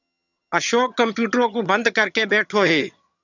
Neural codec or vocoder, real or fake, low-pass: vocoder, 22.05 kHz, 80 mel bands, HiFi-GAN; fake; 7.2 kHz